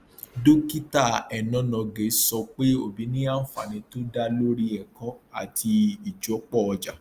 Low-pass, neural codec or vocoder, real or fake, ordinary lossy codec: 14.4 kHz; none; real; Opus, 32 kbps